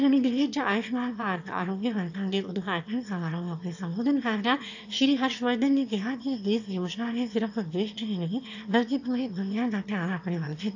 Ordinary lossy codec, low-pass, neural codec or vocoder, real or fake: AAC, 48 kbps; 7.2 kHz; autoencoder, 22.05 kHz, a latent of 192 numbers a frame, VITS, trained on one speaker; fake